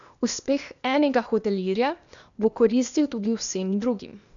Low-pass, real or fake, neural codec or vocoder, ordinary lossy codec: 7.2 kHz; fake; codec, 16 kHz, 0.8 kbps, ZipCodec; none